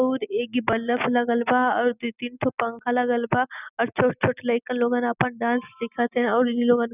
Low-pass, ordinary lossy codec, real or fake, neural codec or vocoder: 3.6 kHz; none; real; none